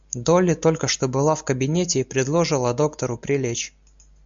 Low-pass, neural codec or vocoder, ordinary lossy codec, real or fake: 7.2 kHz; none; MP3, 64 kbps; real